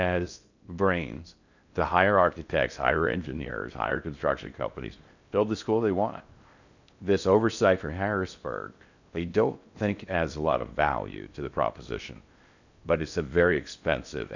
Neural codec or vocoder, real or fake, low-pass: codec, 16 kHz in and 24 kHz out, 0.6 kbps, FocalCodec, streaming, 2048 codes; fake; 7.2 kHz